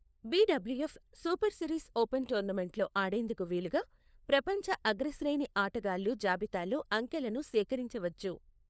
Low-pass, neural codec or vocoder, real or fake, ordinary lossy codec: none; codec, 16 kHz, 4 kbps, FunCodec, trained on LibriTTS, 50 frames a second; fake; none